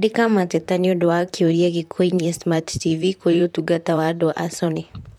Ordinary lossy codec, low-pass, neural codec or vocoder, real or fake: none; 19.8 kHz; vocoder, 44.1 kHz, 128 mel bands, Pupu-Vocoder; fake